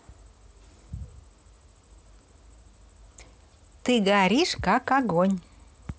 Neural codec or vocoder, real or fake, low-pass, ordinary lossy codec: none; real; none; none